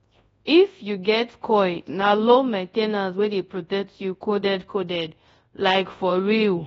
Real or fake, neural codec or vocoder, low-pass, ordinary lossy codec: fake; codec, 24 kHz, 0.9 kbps, WavTokenizer, large speech release; 10.8 kHz; AAC, 24 kbps